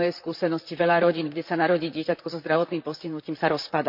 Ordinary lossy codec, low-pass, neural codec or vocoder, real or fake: MP3, 48 kbps; 5.4 kHz; vocoder, 44.1 kHz, 128 mel bands, Pupu-Vocoder; fake